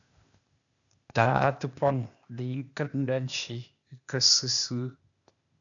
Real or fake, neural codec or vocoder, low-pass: fake; codec, 16 kHz, 0.8 kbps, ZipCodec; 7.2 kHz